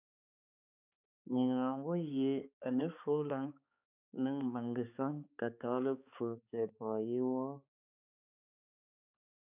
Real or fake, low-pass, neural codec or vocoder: fake; 3.6 kHz; codec, 16 kHz, 4 kbps, X-Codec, HuBERT features, trained on balanced general audio